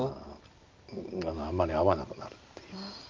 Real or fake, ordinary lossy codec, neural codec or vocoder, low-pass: real; Opus, 24 kbps; none; 7.2 kHz